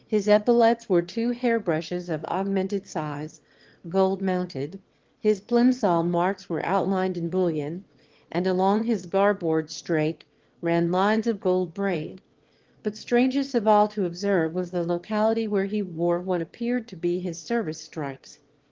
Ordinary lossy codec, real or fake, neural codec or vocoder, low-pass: Opus, 16 kbps; fake; autoencoder, 22.05 kHz, a latent of 192 numbers a frame, VITS, trained on one speaker; 7.2 kHz